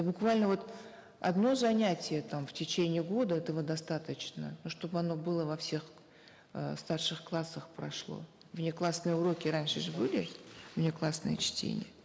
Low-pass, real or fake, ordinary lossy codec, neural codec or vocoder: none; real; none; none